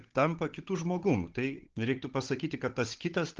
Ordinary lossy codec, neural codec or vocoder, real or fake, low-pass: Opus, 16 kbps; codec, 16 kHz, 16 kbps, FunCodec, trained on Chinese and English, 50 frames a second; fake; 7.2 kHz